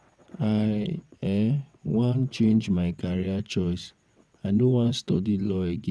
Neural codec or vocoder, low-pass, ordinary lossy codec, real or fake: vocoder, 22.05 kHz, 80 mel bands, WaveNeXt; none; none; fake